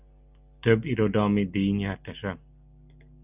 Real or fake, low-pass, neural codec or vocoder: real; 3.6 kHz; none